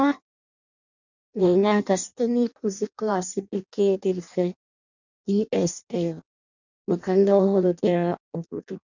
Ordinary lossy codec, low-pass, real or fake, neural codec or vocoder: none; 7.2 kHz; fake; codec, 16 kHz in and 24 kHz out, 0.6 kbps, FireRedTTS-2 codec